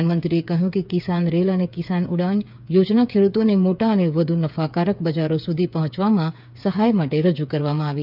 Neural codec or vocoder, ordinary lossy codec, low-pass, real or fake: codec, 16 kHz, 8 kbps, FreqCodec, smaller model; none; 5.4 kHz; fake